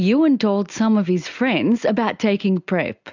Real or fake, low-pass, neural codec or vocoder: real; 7.2 kHz; none